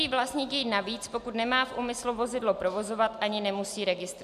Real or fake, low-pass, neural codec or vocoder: real; 14.4 kHz; none